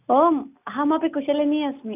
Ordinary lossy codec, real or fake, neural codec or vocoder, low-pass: none; real; none; 3.6 kHz